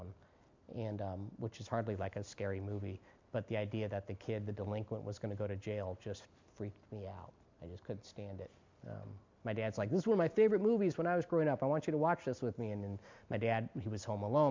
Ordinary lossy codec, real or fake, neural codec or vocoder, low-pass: MP3, 64 kbps; real; none; 7.2 kHz